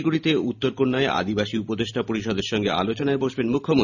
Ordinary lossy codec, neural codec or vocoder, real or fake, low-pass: none; none; real; 7.2 kHz